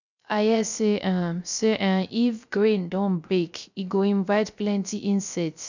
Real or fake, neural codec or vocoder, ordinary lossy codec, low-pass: fake; codec, 16 kHz, 0.3 kbps, FocalCodec; none; 7.2 kHz